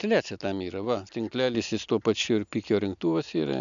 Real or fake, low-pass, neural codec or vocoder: real; 7.2 kHz; none